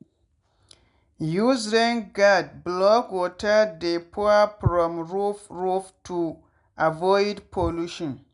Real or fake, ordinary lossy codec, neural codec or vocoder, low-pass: real; none; none; 10.8 kHz